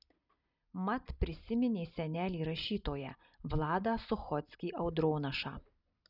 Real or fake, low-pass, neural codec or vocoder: real; 5.4 kHz; none